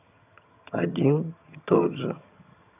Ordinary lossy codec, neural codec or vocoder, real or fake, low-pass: none; vocoder, 22.05 kHz, 80 mel bands, HiFi-GAN; fake; 3.6 kHz